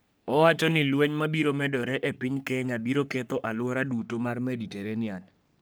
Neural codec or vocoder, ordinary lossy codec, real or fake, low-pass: codec, 44.1 kHz, 3.4 kbps, Pupu-Codec; none; fake; none